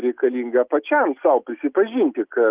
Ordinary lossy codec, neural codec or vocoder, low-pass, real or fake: Opus, 32 kbps; none; 3.6 kHz; real